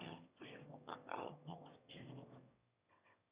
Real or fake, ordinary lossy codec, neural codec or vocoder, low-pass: fake; Opus, 64 kbps; autoencoder, 22.05 kHz, a latent of 192 numbers a frame, VITS, trained on one speaker; 3.6 kHz